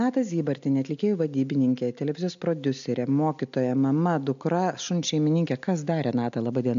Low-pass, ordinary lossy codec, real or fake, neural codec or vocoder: 7.2 kHz; MP3, 64 kbps; real; none